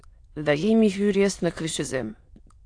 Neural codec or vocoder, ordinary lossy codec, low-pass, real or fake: autoencoder, 22.05 kHz, a latent of 192 numbers a frame, VITS, trained on many speakers; AAC, 48 kbps; 9.9 kHz; fake